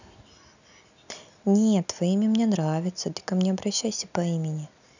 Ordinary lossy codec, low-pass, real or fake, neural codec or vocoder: none; 7.2 kHz; real; none